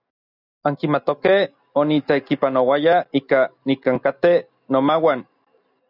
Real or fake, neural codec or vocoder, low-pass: real; none; 5.4 kHz